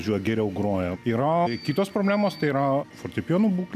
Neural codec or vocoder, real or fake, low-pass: none; real; 14.4 kHz